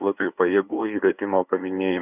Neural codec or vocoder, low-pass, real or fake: codec, 16 kHz, 2 kbps, FunCodec, trained on LibriTTS, 25 frames a second; 3.6 kHz; fake